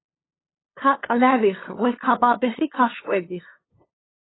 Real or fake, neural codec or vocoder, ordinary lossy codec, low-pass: fake; codec, 16 kHz, 2 kbps, FunCodec, trained on LibriTTS, 25 frames a second; AAC, 16 kbps; 7.2 kHz